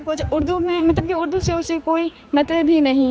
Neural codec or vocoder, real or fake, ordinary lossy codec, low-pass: codec, 16 kHz, 4 kbps, X-Codec, HuBERT features, trained on general audio; fake; none; none